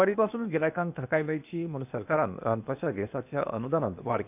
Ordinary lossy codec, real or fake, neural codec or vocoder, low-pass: none; fake; codec, 16 kHz, 0.8 kbps, ZipCodec; 3.6 kHz